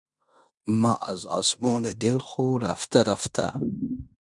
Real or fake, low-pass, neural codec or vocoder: fake; 10.8 kHz; codec, 16 kHz in and 24 kHz out, 0.9 kbps, LongCat-Audio-Codec, fine tuned four codebook decoder